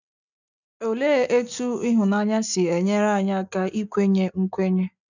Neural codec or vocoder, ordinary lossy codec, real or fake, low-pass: none; none; real; 7.2 kHz